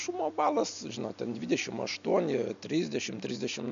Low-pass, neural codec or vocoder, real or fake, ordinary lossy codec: 7.2 kHz; none; real; AAC, 64 kbps